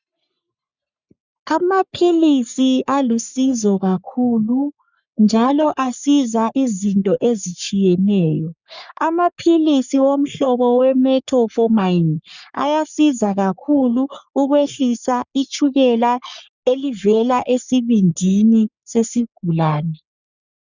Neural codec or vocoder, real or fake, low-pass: codec, 44.1 kHz, 3.4 kbps, Pupu-Codec; fake; 7.2 kHz